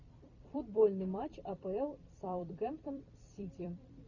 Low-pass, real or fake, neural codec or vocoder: 7.2 kHz; fake; vocoder, 44.1 kHz, 128 mel bands every 256 samples, BigVGAN v2